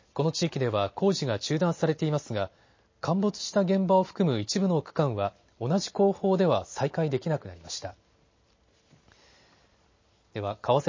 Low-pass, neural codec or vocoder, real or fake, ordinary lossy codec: 7.2 kHz; none; real; MP3, 32 kbps